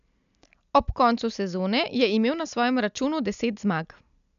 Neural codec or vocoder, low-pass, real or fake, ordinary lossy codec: none; 7.2 kHz; real; none